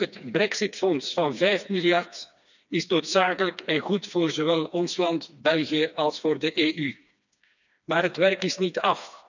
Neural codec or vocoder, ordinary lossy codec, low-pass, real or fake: codec, 16 kHz, 2 kbps, FreqCodec, smaller model; none; 7.2 kHz; fake